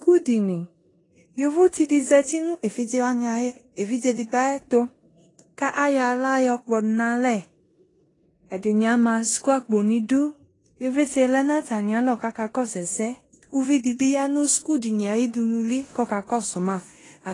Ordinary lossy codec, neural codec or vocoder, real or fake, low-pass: AAC, 32 kbps; codec, 16 kHz in and 24 kHz out, 0.9 kbps, LongCat-Audio-Codec, four codebook decoder; fake; 10.8 kHz